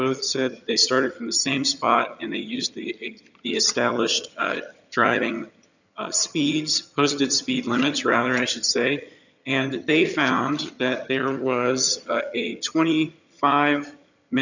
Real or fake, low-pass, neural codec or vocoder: fake; 7.2 kHz; vocoder, 22.05 kHz, 80 mel bands, HiFi-GAN